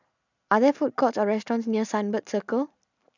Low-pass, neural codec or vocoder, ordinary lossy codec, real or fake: 7.2 kHz; none; none; real